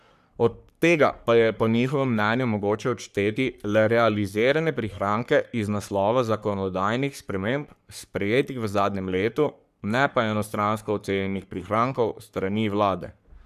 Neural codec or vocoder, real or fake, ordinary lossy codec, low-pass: codec, 44.1 kHz, 3.4 kbps, Pupu-Codec; fake; none; 14.4 kHz